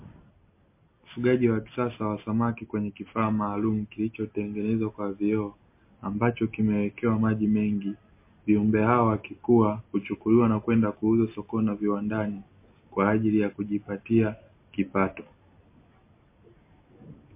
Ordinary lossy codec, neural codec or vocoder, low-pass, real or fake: MP3, 24 kbps; none; 3.6 kHz; real